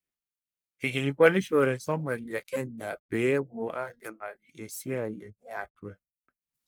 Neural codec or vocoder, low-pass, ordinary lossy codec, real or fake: codec, 44.1 kHz, 1.7 kbps, Pupu-Codec; none; none; fake